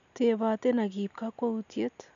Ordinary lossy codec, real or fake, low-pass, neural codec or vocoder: none; real; 7.2 kHz; none